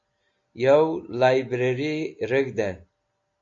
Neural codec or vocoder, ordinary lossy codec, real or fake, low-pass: none; AAC, 64 kbps; real; 7.2 kHz